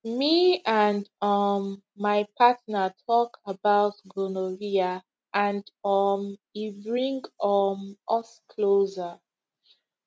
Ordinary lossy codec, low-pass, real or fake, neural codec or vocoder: none; none; real; none